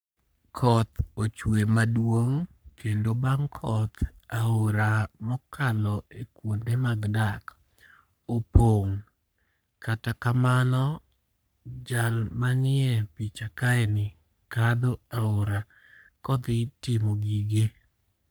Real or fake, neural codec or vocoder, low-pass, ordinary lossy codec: fake; codec, 44.1 kHz, 3.4 kbps, Pupu-Codec; none; none